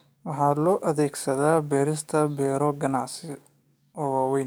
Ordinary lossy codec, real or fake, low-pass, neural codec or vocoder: none; fake; none; codec, 44.1 kHz, 7.8 kbps, DAC